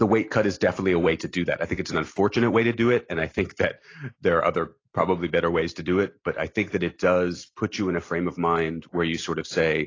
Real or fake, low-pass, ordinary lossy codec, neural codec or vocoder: real; 7.2 kHz; AAC, 32 kbps; none